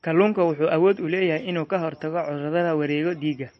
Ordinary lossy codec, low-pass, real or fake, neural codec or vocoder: MP3, 32 kbps; 10.8 kHz; real; none